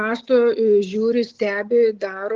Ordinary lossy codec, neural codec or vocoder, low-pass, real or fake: Opus, 16 kbps; none; 7.2 kHz; real